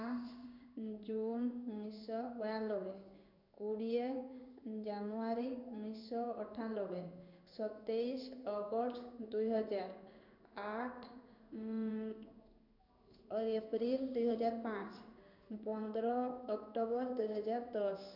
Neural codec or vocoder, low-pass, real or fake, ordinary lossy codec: codec, 16 kHz in and 24 kHz out, 1 kbps, XY-Tokenizer; 5.4 kHz; fake; none